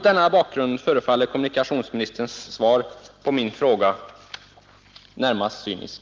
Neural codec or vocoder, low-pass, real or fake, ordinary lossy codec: none; 7.2 kHz; real; Opus, 32 kbps